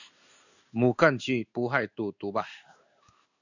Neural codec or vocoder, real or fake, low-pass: codec, 16 kHz in and 24 kHz out, 1 kbps, XY-Tokenizer; fake; 7.2 kHz